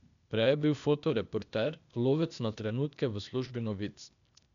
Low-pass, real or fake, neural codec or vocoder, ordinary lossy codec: 7.2 kHz; fake; codec, 16 kHz, 0.8 kbps, ZipCodec; none